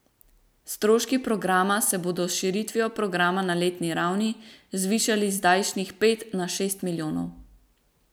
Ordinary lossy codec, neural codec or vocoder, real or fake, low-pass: none; none; real; none